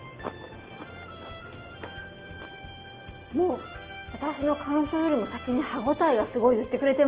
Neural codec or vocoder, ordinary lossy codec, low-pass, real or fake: none; Opus, 16 kbps; 3.6 kHz; real